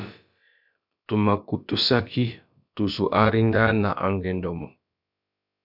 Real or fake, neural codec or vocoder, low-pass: fake; codec, 16 kHz, about 1 kbps, DyCAST, with the encoder's durations; 5.4 kHz